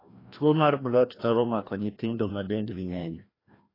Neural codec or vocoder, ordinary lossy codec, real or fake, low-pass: codec, 16 kHz, 1 kbps, FreqCodec, larger model; AAC, 24 kbps; fake; 5.4 kHz